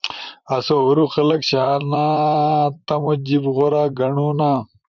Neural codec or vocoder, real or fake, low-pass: vocoder, 44.1 kHz, 128 mel bands, Pupu-Vocoder; fake; 7.2 kHz